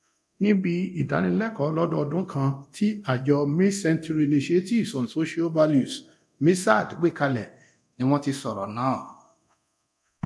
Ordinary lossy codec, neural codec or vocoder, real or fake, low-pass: none; codec, 24 kHz, 0.9 kbps, DualCodec; fake; none